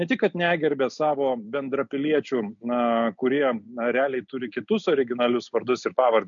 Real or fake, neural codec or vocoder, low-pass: real; none; 7.2 kHz